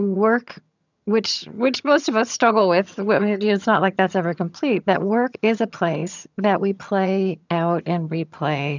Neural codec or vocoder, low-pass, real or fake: vocoder, 22.05 kHz, 80 mel bands, HiFi-GAN; 7.2 kHz; fake